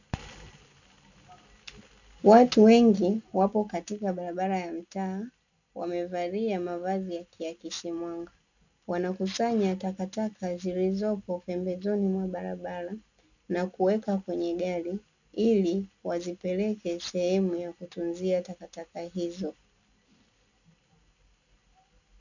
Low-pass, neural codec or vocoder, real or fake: 7.2 kHz; none; real